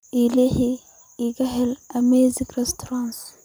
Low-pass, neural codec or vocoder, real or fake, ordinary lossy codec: none; vocoder, 44.1 kHz, 128 mel bands every 256 samples, BigVGAN v2; fake; none